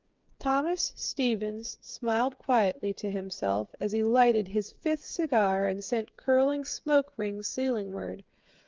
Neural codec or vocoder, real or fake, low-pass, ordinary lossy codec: codec, 16 kHz, 8 kbps, FreqCodec, smaller model; fake; 7.2 kHz; Opus, 24 kbps